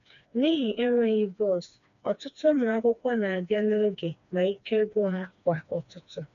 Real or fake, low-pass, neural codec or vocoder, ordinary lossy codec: fake; 7.2 kHz; codec, 16 kHz, 2 kbps, FreqCodec, smaller model; none